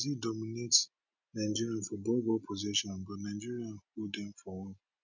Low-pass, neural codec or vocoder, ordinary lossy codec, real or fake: 7.2 kHz; none; none; real